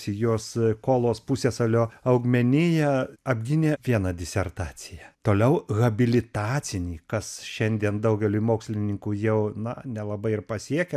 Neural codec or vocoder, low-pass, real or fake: none; 14.4 kHz; real